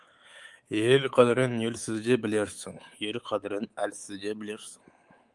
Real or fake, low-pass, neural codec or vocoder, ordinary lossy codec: fake; 10.8 kHz; codec, 24 kHz, 3.1 kbps, DualCodec; Opus, 24 kbps